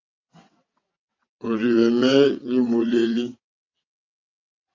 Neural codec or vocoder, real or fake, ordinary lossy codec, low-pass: vocoder, 22.05 kHz, 80 mel bands, WaveNeXt; fake; AAC, 48 kbps; 7.2 kHz